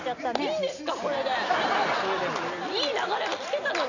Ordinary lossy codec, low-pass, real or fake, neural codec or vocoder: none; 7.2 kHz; real; none